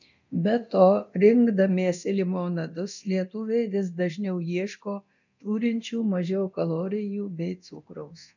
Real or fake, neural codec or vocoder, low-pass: fake; codec, 24 kHz, 0.9 kbps, DualCodec; 7.2 kHz